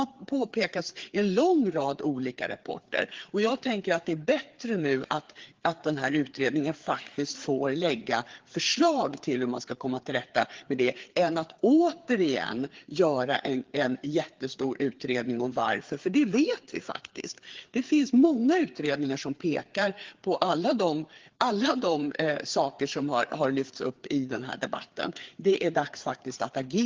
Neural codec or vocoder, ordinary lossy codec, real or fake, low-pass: codec, 16 kHz, 4 kbps, FreqCodec, larger model; Opus, 16 kbps; fake; 7.2 kHz